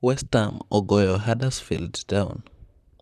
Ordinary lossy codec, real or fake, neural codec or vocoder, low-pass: none; fake; vocoder, 44.1 kHz, 128 mel bands, Pupu-Vocoder; 14.4 kHz